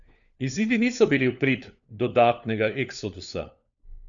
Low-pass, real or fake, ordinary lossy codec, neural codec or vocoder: 7.2 kHz; fake; none; codec, 16 kHz, 4 kbps, FunCodec, trained on LibriTTS, 50 frames a second